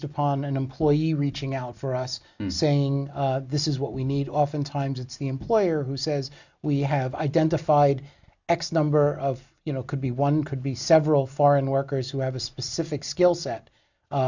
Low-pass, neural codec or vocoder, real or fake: 7.2 kHz; none; real